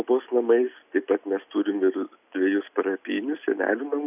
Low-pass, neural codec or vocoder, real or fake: 3.6 kHz; none; real